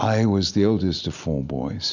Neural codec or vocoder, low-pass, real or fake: none; 7.2 kHz; real